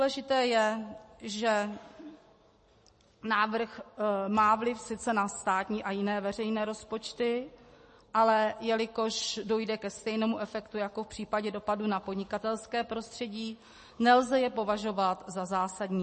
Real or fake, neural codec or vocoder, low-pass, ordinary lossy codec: real; none; 10.8 kHz; MP3, 32 kbps